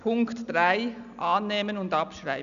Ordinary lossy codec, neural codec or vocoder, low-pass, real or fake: none; none; 7.2 kHz; real